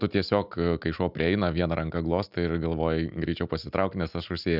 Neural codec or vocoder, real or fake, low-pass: none; real; 5.4 kHz